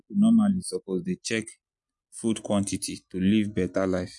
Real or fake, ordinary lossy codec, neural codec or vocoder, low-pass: real; MP3, 64 kbps; none; 10.8 kHz